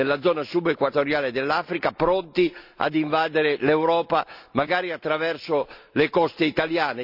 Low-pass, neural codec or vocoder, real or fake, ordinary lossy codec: 5.4 kHz; none; real; none